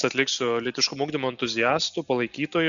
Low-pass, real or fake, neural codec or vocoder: 7.2 kHz; real; none